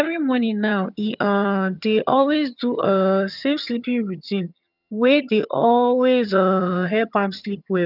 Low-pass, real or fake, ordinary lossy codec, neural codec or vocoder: 5.4 kHz; fake; none; vocoder, 22.05 kHz, 80 mel bands, HiFi-GAN